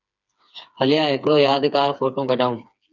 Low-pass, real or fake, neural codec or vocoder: 7.2 kHz; fake; codec, 16 kHz, 4 kbps, FreqCodec, smaller model